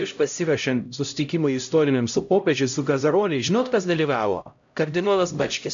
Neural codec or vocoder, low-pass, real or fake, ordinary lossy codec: codec, 16 kHz, 0.5 kbps, X-Codec, HuBERT features, trained on LibriSpeech; 7.2 kHz; fake; AAC, 64 kbps